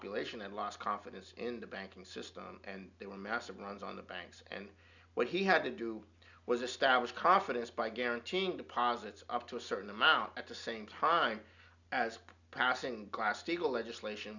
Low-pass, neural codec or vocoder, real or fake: 7.2 kHz; none; real